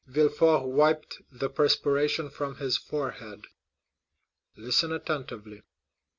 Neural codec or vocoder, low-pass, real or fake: none; 7.2 kHz; real